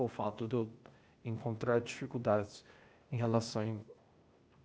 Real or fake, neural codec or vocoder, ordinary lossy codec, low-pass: fake; codec, 16 kHz, 0.8 kbps, ZipCodec; none; none